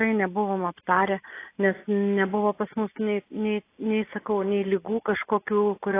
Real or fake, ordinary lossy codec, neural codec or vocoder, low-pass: real; AAC, 24 kbps; none; 3.6 kHz